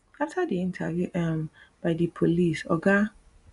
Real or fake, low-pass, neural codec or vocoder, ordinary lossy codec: real; 10.8 kHz; none; none